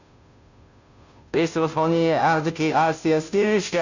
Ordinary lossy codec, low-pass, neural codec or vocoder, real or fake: none; 7.2 kHz; codec, 16 kHz, 0.5 kbps, FunCodec, trained on Chinese and English, 25 frames a second; fake